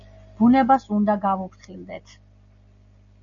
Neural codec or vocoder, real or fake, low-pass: none; real; 7.2 kHz